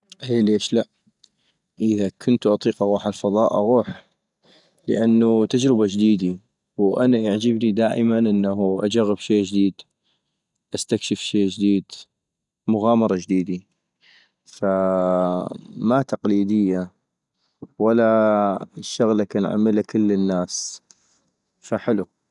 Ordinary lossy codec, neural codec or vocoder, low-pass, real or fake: none; none; 10.8 kHz; real